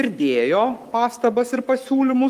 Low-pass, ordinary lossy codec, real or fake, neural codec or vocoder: 14.4 kHz; Opus, 32 kbps; fake; codec, 44.1 kHz, 7.8 kbps, Pupu-Codec